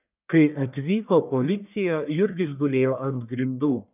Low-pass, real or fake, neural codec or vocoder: 3.6 kHz; fake; codec, 44.1 kHz, 1.7 kbps, Pupu-Codec